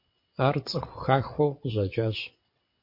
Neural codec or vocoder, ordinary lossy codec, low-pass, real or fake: vocoder, 22.05 kHz, 80 mel bands, WaveNeXt; MP3, 32 kbps; 5.4 kHz; fake